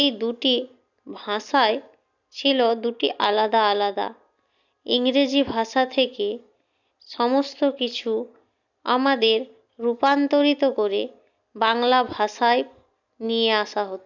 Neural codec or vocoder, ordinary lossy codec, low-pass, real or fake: none; none; 7.2 kHz; real